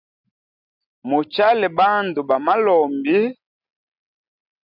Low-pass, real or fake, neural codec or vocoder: 5.4 kHz; real; none